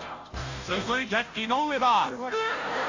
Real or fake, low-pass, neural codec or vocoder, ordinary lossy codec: fake; 7.2 kHz; codec, 16 kHz, 0.5 kbps, FunCodec, trained on Chinese and English, 25 frames a second; AAC, 48 kbps